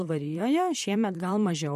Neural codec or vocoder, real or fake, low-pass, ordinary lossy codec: vocoder, 44.1 kHz, 128 mel bands, Pupu-Vocoder; fake; 14.4 kHz; MP3, 64 kbps